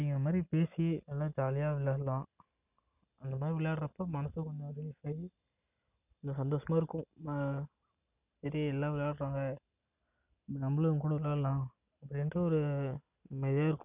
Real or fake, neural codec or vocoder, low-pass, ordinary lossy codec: real; none; 3.6 kHz; none